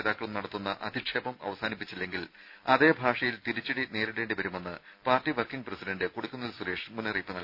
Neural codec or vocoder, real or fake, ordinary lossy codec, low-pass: none; real; none; 5.4 kHz